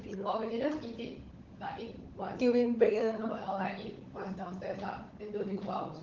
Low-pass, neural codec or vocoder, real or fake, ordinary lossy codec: 7.2 kHz; codec, 16 kHz, 16 kbps, FunCodec, trained on LibriTTS, 50 frames a second; fake; Opus, 16 kbps